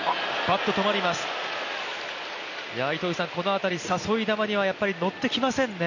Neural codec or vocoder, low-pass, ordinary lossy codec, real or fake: none; 7.2 kHz; none; real